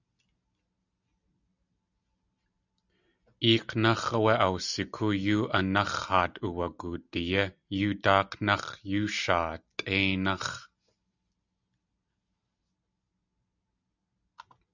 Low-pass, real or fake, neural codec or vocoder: 7.2 kHz; real; none